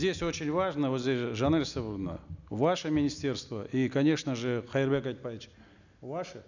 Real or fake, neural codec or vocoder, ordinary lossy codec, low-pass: real; none; none; 7.2 kHz